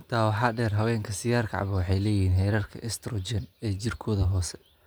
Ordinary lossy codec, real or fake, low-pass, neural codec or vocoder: none; real; none; none